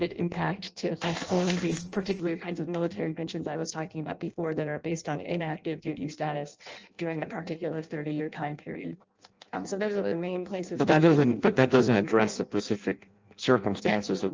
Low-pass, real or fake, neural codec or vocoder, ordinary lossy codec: 7.2 kHz; fake; codec, 16 kHz in and 24 kHz out, 0.6 kbps, FireRedTTS-2 codec; Opus, 24 kbps